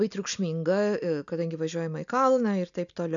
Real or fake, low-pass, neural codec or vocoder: real; 7.2 kHz; none